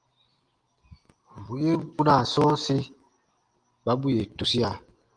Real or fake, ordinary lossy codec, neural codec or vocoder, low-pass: real; Opus, 24 kbps; none; 9.9 kHz